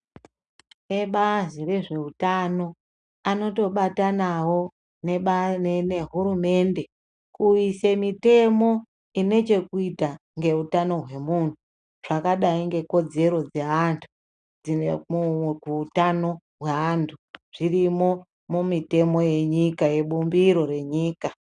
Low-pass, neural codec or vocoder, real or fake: 9.9 kHz; none; real